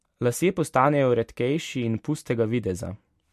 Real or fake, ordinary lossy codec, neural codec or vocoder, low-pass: real; MP3, 64 kbps; none; 14.4 kHz